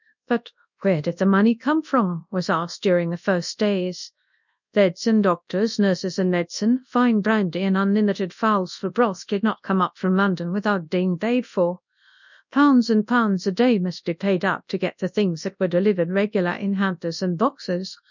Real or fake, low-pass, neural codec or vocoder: fake; 7.2 kHz; codec, 24 kHz, 0.9 kbps, WavTokenizer, large speech release